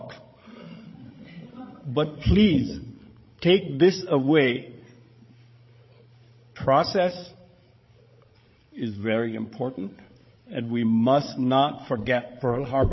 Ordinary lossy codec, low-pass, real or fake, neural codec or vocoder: MP3, 24 kbps; 7.2 kHz; fake; codec, 16 kHz, 16 kbps, FreqCodec, larger model